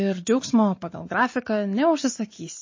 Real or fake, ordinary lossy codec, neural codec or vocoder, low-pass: real; MP3, 32 kbps; none; 7.2 kHz